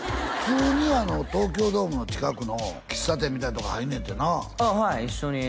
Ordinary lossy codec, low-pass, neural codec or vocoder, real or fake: none; none; none; real